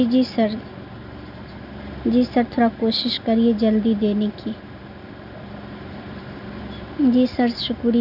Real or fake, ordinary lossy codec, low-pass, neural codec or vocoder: real; none; 5.4 kHz; none